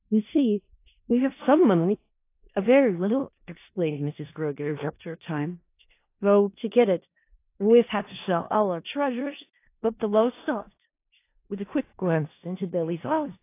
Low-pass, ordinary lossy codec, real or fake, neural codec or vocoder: 3.6 kHz; AAC, 24 kbps; fake; codec, 16 kHz in and 24 kHz out, 0.4 kbps, LongCat-Audio-Codec, four codebook decoder